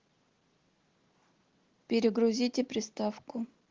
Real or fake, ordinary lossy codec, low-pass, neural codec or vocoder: real; Opus, 16 kbps; 7.2 kHz; none